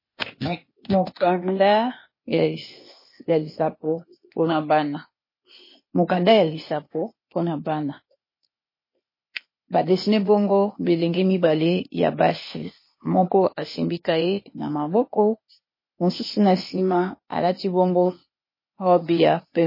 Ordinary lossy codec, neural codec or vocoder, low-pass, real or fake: MP3, 24 kbps; codec, 16 kHz, 0.8 kbps, ZipCodec; 5.4 kHz; fake